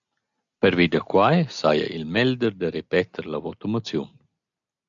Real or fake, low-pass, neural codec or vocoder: real; 7.2 kHz; none